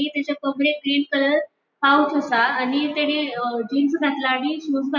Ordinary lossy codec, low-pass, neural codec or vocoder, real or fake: none; 7.2 kHz; none; real